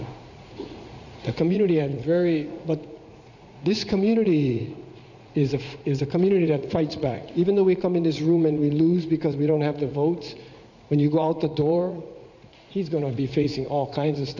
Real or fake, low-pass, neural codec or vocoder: fake; 7.2 kHz; vocoder, 44.1 kHz, 80 mel bands, Vocos